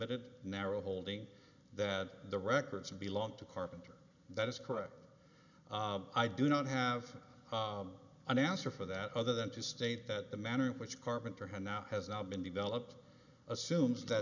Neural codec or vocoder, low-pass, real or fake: none; 7.2 kHz; real